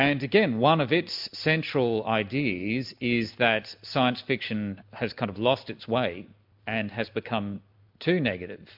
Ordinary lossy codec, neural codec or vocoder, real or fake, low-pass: MP3, 48 kbps; none; real; 5.4 kHz